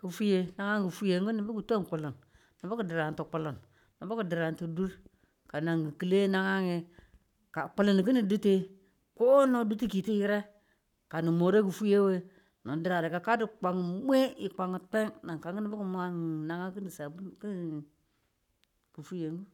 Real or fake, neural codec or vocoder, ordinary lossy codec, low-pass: fake; codec, 44.1 kHz, 7.8 kbps, Pupu-Codec; none; 19.8 kHz